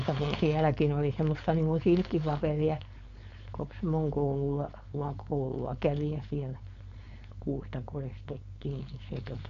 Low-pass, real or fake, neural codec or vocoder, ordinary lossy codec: 7.2 kHz; fake; codec, 16 kHz, 4.8 kbps, FACodec; none